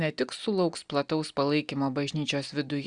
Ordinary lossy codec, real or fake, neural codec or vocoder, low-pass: Opus, 64 kbps; real; none; 9.9 kHz